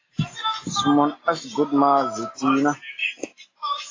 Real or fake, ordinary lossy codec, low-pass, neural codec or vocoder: real; AAC, 32 kbps; 7.2 kHz; none